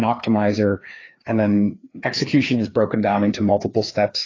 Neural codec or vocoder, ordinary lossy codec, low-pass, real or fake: codec, 16 kHz, 2 kbps, FreqCodec, larger model; AAC, 32 kbps; 7.2 kHz; fake